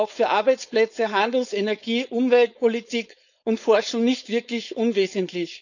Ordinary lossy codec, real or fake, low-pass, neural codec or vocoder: none; fake; 7.2 kHz; codec, 16 kHz, 4.8 kbps, FACodec